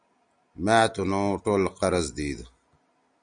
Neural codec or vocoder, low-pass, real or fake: none; 9.9 kHz; real